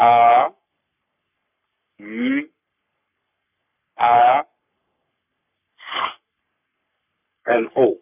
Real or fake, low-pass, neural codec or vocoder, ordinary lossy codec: fake; 3.6 kHz; codec, 44.1 kHz, 3.4 kbps, Pupu-Codec; none